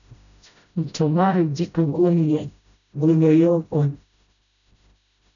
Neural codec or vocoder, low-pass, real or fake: codec, 16 kHz, 0.5 kbps, FreqCodec, smaller model; 7.2 kHz; fake